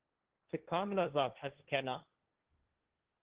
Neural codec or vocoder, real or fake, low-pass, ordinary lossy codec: codec, 16 kHz, 1.1 kbps, Voila-Tokenizer; fake; 3.6 kHz; Opus, 16 kbps